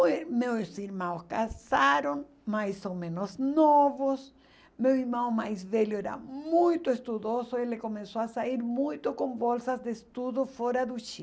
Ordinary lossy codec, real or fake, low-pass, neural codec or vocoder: none; real; none; none